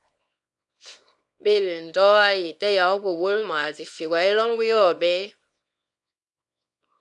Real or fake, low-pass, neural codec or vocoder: fake; 10.8 kHz; codec, 24 kHz, 0.9 kbps, WavTokenizer, small release